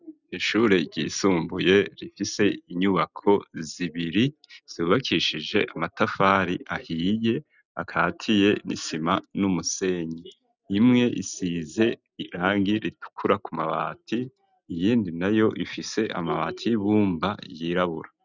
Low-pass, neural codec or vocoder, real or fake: 7.2 kHz; none; real